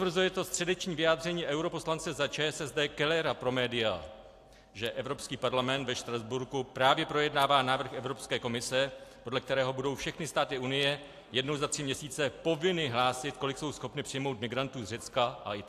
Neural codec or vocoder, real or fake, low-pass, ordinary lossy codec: none; real; 14.4 kHz; AAC, 64 kbps